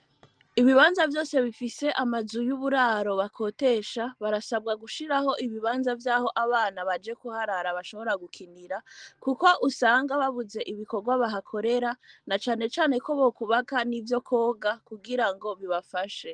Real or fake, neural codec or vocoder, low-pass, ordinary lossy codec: real; none; 9.9 kHz; Opus, 24 kbps